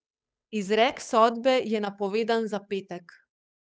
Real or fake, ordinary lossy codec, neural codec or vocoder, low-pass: fake; none; codec, 16 kHz, 8 kbps, FunCodec, trained on Chinese and English, 25 frames a second; none